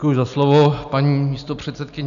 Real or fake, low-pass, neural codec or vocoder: real; 7.2 kHz; none